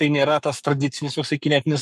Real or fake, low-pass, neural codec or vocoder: fake; 14.4 kHz; codec, 44.1 kHz, 7.8 kbps, Pupu-Codec